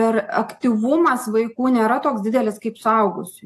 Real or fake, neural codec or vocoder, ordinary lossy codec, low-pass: real; none; AAC, 64 kbps; 14.4 kHz